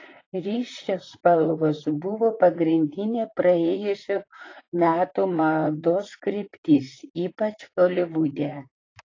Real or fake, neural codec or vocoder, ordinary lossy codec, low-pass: fake; vocoder, 44.1 kHz, 128 mel bands, Pupu-Vocoder; AAC, 32 kbps; 7.2 kHz